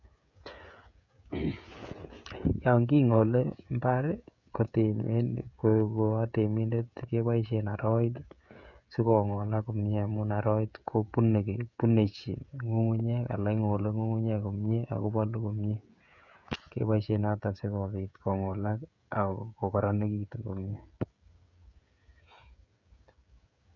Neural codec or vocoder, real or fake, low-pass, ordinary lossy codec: codec, 16 kHz, 16 kbps, FreqCodec, smaller model; fake; 7.2 kHz; none